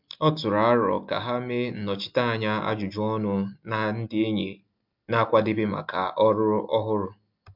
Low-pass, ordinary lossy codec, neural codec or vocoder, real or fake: 5.4 kHz; MP3, 48 kbps; none; real